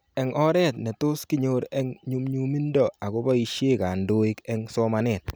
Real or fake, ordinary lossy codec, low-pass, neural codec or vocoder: real; none; none; none